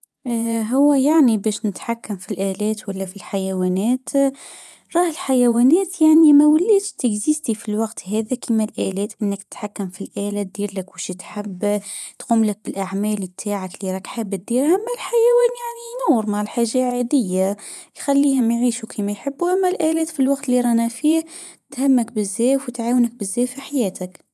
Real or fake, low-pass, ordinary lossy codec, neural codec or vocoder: fake; none; none; vocoder, 24 kHz, 100 mel bands, Vocos